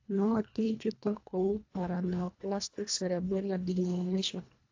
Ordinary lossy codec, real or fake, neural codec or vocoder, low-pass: AAC, 48 kbps; fake; codec, 24 kHz, 1.5 kbps, HILCodec; 7.2 kHz